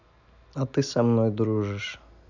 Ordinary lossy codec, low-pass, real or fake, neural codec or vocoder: none; 7.2 kHz; real; none